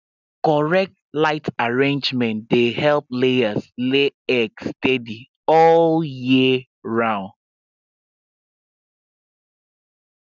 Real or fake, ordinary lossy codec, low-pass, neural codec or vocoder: real; none; 7.2 kHz; none